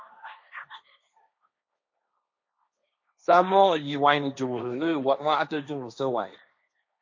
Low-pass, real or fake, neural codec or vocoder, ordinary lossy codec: 7.2 kHz; fake; codec, 16 kHz, 1.1 kbps, Voila-Tokenizer; MP3, 48 kbps